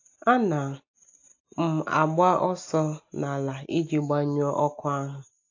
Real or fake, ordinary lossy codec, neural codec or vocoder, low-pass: real; AAC, 48 kbps; none; 7.2 kHz